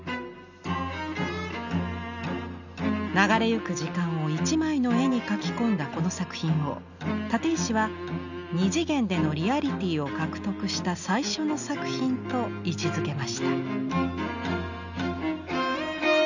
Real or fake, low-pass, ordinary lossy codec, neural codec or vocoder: real; 7.2 kHz; none; none